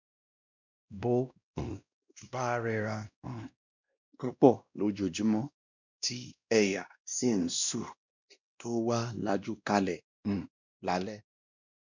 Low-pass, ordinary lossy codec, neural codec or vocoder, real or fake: 7.2 kHz; none; codec, 16 kHz, 1 kbps, X-Codec, WavLM features, trained on Multilingual LibriSpeech; fake